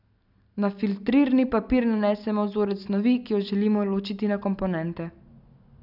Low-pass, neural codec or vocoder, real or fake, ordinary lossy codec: 5.4 kHz; none; real; none